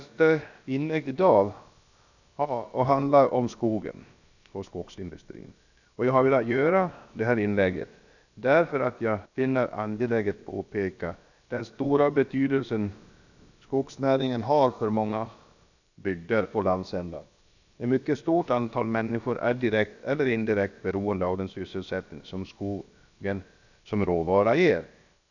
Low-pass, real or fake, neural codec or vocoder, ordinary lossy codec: 7.2 kHz; fake; codec, 16 kHz, about 1 kbps, DyCAST, with the encoder's durations; none